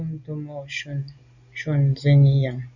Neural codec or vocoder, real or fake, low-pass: none; real; 7.2 kHz